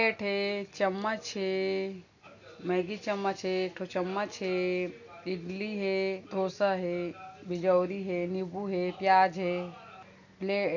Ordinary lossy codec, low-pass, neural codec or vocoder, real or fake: none; 7.2 kHz; none; real